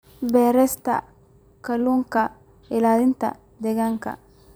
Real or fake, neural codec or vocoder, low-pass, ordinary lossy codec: fake; vocoder, 44.1 kHz, 128 mel bands every 256 samples, BigVGAN v2; none; none